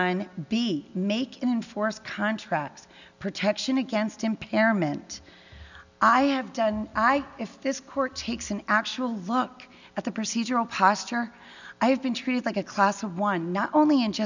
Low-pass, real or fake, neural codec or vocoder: 7.2 kHz; real; none